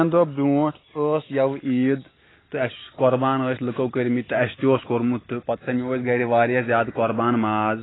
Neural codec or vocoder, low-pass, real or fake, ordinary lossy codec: none; 7.2 kHz; real; AAC, 16 kbps